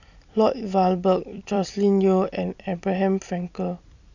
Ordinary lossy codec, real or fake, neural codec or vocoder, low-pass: none; fake; vocoder, 44.1 kHz, 128 mel bands every 256 samples, BigVGAN v2; 7.2 kHz